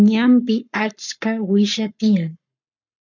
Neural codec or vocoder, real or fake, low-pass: codec, 44.1 kHz, 7.8 kbps, Pupu-Codec; fake; 7.2 kHz